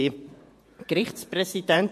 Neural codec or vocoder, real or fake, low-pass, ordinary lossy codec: vocoder, 48 kHz, 128 mel bands, Vocos; fake; 14.4 kHz; MP3, 64 kbps